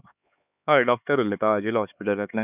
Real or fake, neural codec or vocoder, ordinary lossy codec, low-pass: fake; codec, 16 kHz, 4 kbps, X-Codec, HuBERT features, trained on LibriSpeech; none; 3.6 kHz